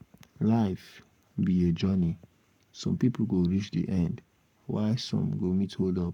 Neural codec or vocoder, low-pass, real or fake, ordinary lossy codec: codec, 44.1 kHz, 7.8 kbps, Pupu-Codec; 19.8 kHz; fake; none